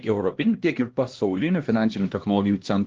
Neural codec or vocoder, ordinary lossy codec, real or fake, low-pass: codec, 16 kHz, 1 kbps, X-Codec, HuBERT features, trained on LibriSpeech; Opus, 16 kbps; fake; 7.2 kHz